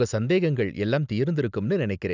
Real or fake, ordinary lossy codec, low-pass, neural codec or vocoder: real; none; 7.2 kHz; none